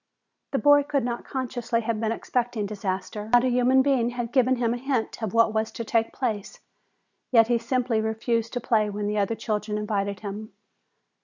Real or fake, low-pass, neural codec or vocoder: real; 7.2 kHz; none